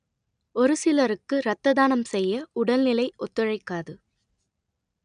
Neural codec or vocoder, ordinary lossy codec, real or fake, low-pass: none; none; real; 9.9 kHz